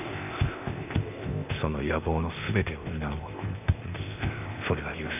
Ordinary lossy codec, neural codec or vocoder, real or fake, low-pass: none; codec, 16 kHz, 0.8 kbps, ZipCodec; fake; 3.6 kHz